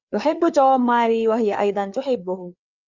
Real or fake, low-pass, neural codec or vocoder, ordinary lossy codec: fake; 7.2 kHz; codec, 16 kHz, 4 kbps, FreqCodec, larger model; Opus, 64 kbps